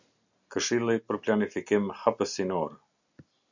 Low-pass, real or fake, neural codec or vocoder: 7.2 kHz; real; none